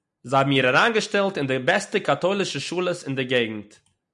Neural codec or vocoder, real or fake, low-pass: none; real; 10.8 kHz